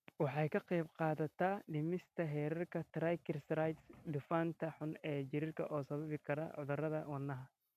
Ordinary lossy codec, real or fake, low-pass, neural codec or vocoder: MP3, 96 kbps; real; 14.4 kHz; none